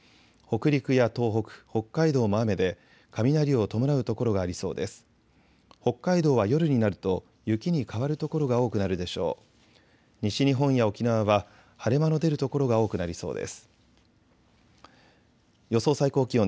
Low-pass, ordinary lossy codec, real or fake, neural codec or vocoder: none; none; real; none